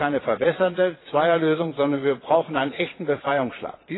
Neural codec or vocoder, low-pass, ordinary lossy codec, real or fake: vocoder, 22.05 kHz, 80 mel bands, Vocos; 7.2 kHz; AAC, 16 kbps; fake